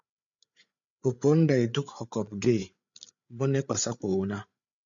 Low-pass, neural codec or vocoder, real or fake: 7.2 kHz; codec, 16 kHz, 8 kbps, FreqCodec, larger model; fake